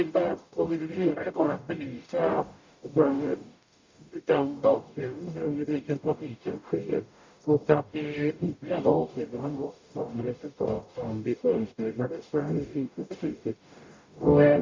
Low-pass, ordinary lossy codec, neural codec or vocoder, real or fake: 7.2 kHz; MP3, 64 kbps; codec, 44.1 kHz, 0.9 kbps, DAC; fake